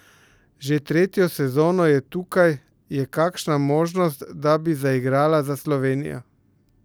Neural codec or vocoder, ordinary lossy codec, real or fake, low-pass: none; none; real; none